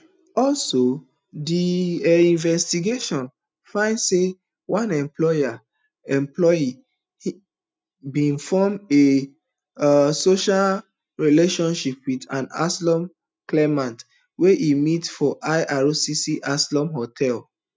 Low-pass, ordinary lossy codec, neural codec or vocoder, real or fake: none; none; none; real